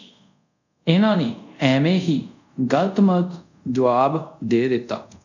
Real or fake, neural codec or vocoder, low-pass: fake; codec, 24 kHz, 0.5 kbps, DualCodec; 7.2 kHz